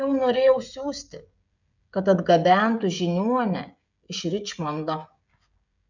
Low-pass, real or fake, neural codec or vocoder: 7.2 kHz; fake; codec, 16 kHz, 16 kbps, FreqCodec, smaller model